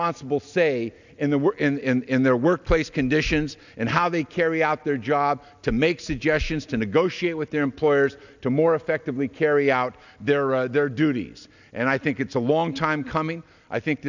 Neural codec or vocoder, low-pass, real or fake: none; 7.2 kHz; real